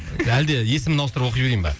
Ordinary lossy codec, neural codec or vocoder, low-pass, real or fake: none; none; none; real